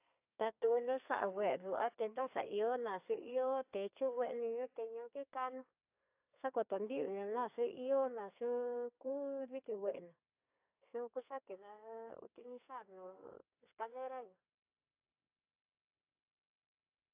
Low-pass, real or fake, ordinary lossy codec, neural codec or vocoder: 3.6 kHz; fake; AAC, 32 kbps; codec, 32 kHz, 1.9 kbps, SNAC